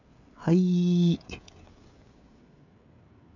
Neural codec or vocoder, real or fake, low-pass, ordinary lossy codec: codec, 16 kHz, 16 kbps, FreqCodec, smaller model; fake; 7.2 kHz; none